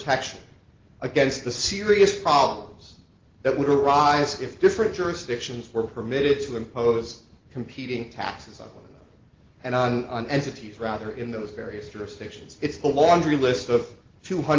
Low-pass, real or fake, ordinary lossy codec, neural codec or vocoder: 7.2 kHz; real; Opus, 16 kbps; none